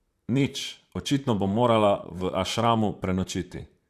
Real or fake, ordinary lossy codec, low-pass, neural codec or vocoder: fake; Opus, 64 kbps; 14.4 kHz; vocoder, 44.1 kHz, 128 mel bands, Pupu-Vocoder